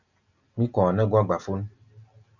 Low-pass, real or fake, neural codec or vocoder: 7.2 kHz; real; none